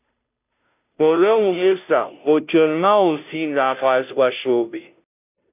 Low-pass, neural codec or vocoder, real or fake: 3.6 kHz; codec, 16 kHz, 0.5 kbps, FunCodec, trained on Chinese and English, 25 frames a second; fake